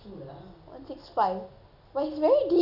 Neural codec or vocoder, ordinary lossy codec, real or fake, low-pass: none; none; real; 5.4 kHz